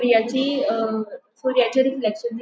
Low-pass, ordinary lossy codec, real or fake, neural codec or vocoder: none; none; real; none